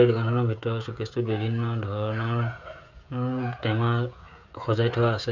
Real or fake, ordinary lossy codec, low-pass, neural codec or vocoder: fake; none; 7.2 kHz; codec, 16 kHz, 16 kbps, FreqCodec, smaller model